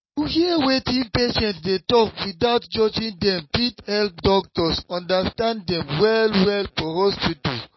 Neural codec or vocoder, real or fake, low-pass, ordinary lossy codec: none; real; 7.2 kHz; MP3, 24 kbps